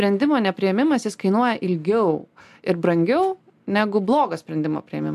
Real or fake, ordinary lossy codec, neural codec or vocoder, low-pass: real; AAC, 96 kbps; none; 14.4 kHz